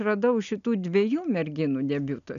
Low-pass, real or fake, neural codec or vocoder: 7.2 kHz; real; none